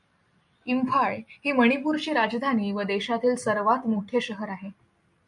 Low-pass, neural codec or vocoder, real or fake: 10.8 kHz; none; real